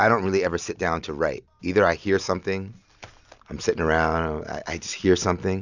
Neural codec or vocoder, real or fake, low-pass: none; real; 7.2 kHz